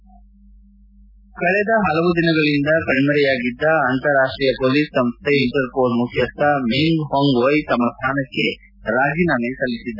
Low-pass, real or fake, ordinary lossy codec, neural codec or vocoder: 5.4 kHz; real; none; none